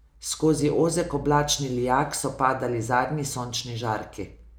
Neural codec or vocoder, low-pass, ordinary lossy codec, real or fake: none; none; none; real